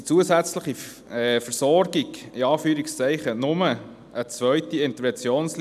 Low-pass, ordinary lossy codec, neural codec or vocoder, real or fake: 14.4 kHz; none; none; real